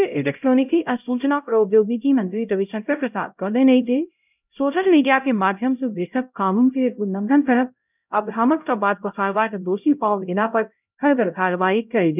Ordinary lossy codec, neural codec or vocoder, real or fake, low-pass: none; codec, 16 kHz, 0.5 kbps, X-Codec, HuBERT features, trained on LibriSpeech; fake; 3.6 kHz